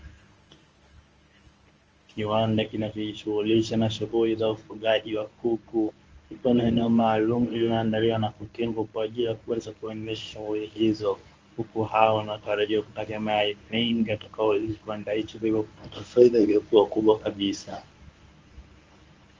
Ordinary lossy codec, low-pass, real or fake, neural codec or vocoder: Opus, 24 kbps; 7.2 kHz; fake; codec, 24 kHz, 0.9 kbps, WavTokenizer, medium speech release version 1